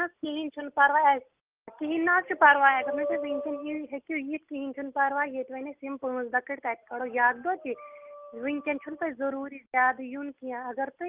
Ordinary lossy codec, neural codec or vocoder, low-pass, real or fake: Opus, 32 kbps; none; 3.6 kHz; real